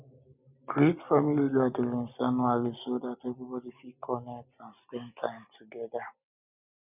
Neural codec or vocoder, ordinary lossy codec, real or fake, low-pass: none; none; real; 3.6 kHz